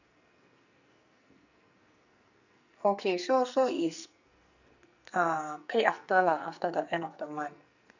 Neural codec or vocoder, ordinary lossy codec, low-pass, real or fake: codec, 44.1 kHz, 2.6 kbps, SNAC; none; 7.2 kHz; fake